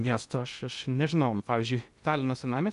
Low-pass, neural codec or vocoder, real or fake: 10.8 kHz; codec, 16 kHz in and 24 kHz out, 0.8 kbps, FocalCodec, streaming, 65536 codes; fake